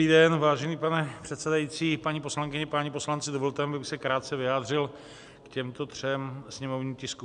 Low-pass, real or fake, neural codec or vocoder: 10.8 kHz; real; none